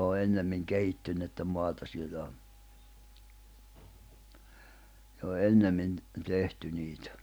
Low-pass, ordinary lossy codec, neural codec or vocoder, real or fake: none; none; none; real